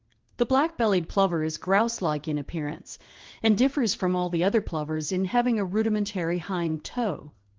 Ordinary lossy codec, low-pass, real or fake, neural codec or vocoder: Opus, 16 kbps; 7.2 kHz; fake; codec, 16 kHz in and 24 kHz out, 1 kbps, XY-Tokenizer